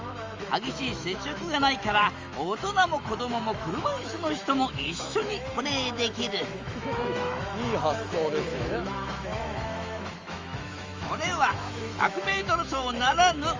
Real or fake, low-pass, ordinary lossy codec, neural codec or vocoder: fake; 7.2 kHz; Opus, 32 kbps; autoencoder, 48 kHz, 128 numbers a frame, DAC-VAE, trained on Japanese speech